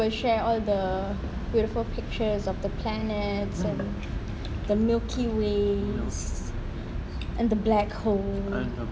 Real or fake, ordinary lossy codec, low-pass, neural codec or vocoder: real; none; none; none